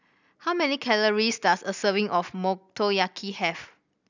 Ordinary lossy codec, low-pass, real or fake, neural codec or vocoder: none; 7.2 kHz; real; none